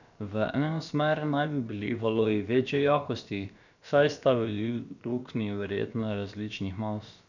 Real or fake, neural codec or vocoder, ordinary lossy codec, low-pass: fake; codec, 16 kHz, about 1 kbps, DyCAST, with the encoder's durations; none; 7.2 kHz